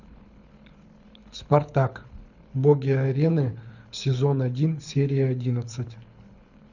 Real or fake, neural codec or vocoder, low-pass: fake; codec, 24 kHz, 6 kbps, HILCodec; 7.2 kHz